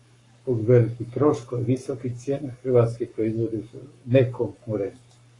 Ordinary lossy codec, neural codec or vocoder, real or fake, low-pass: AAC, 32 kbps; codec, 44.1 kHz, 7.8 kbps, Pupu-Codec; fake; 10.8 kHz